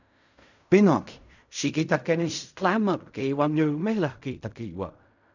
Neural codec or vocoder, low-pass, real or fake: codec, 16 kHz in and 24 kHz out, 0.4 kbps, LongCat-Audio-Codec, fine tuned four codebook decoder; 7.2 kHz; fake